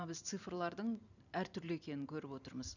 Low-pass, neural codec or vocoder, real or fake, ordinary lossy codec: 7.2 kHz; vocoder, 44.1 kHz, 128 mel bands every 512 samples, BigVGAN v2; fake; none